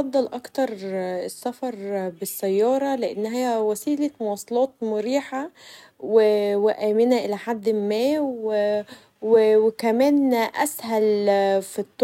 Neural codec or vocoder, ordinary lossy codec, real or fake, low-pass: none; none; real; 19.8 kHz